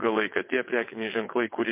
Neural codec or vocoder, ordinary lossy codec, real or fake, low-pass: vocoder, 22.05 kHz, 80 mel bands, WaveNeXt; MP3, 24 kbps; fake; 3.6 kHz